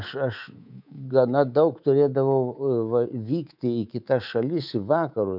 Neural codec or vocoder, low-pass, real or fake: codec, 24 kHz, 3.1 kbps, DualCodec; 5.4 kHz; fake